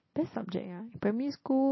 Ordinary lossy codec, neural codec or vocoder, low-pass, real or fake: MP3, 24 kbps; none; 7.2 kHz; real